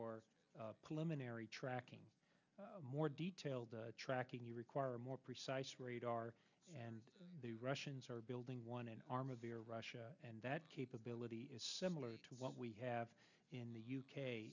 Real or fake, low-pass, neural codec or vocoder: real; 7.2 kHz; none